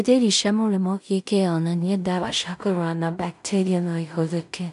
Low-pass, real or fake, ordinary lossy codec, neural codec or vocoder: 10.8 kHz; fake; none; codec, 16 kHz in and 24 kHz out, 0.4 kbps, LongCat-Audio-Codec, two codebook decoder